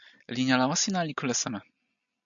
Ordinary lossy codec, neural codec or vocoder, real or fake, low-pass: MP3, 96 kbps; none; real; 7.2 kHz